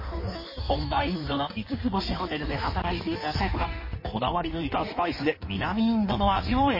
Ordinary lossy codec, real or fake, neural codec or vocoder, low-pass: MP3, 24 kbps; fake; codec, 16 kHz in and 24 kHz out, 1.1 kbps, FireRedTTS-2 codec; 5.4 kHz